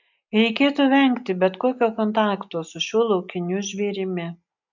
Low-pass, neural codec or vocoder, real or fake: 7.2 kHz; none; real